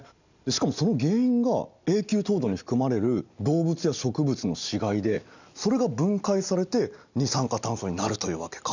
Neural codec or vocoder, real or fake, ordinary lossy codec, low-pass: none; real; none; 7.2 kHz